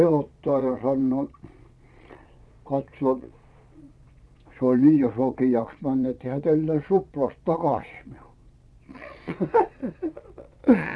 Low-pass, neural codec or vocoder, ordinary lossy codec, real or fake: none; vocoder, 22.05 kHz, 80 mel bands, Vocos; none; fake